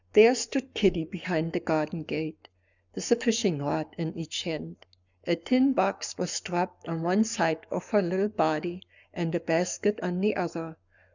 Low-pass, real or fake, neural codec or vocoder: 7.2 kHz; fake; codec, 44.1 kHz, 7.8 kbps, Pupu-Codec